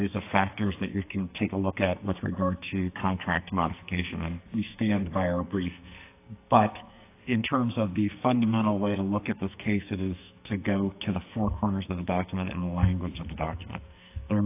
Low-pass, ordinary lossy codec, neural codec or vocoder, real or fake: 3.6 kHz; AAC, 24 kbps; codec, 44.1 kHz, 2.6 kbps, SNAC; fake